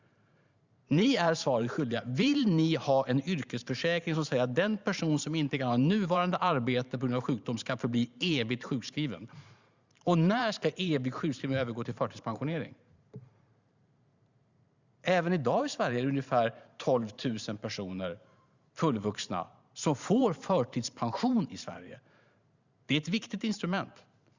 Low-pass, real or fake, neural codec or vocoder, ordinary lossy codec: 7.2 kHz; fake; vocoder, 44.1 kHz, 128 mel bands every 512 samples, BigVGAN v2; Opus, 64 kbps